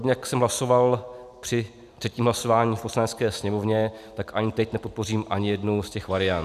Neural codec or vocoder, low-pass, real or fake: none; 14.4 kHz; real